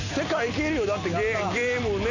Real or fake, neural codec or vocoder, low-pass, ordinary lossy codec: real; none; 7.2 kHz; none